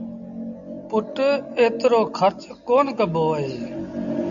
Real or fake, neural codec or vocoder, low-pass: real; none; 7.2 kHz